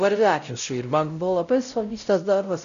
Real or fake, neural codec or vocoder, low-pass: fake; codec, 16 kHz, 0.5 kbps, X-Codec, WavLM features, trained on Multilingual LibriSpeech; 7.2 kHz